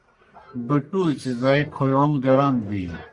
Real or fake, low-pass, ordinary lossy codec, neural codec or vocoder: fake; 10.8 kHz; Opus, 64 kbps; codec, 44.1 kHz, 1.7 kbps, Pupu-Codec